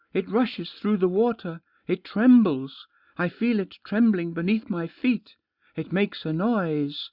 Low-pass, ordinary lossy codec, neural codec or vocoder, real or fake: 5.4 kHz; Opus, 64 kbps; none; real